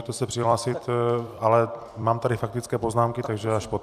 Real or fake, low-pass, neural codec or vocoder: fake; 14.4 kHz; vocoder, 44.1 kHz, 128 mel bands every 256 samples, BigVGAN v2